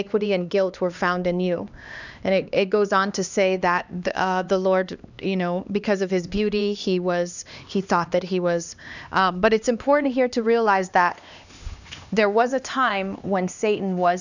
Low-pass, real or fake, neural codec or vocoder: 7.2 kHz; fake; codec, 16 kHz, 2 kbps, X-Codec, HuBERT features, trained on LibriSpeech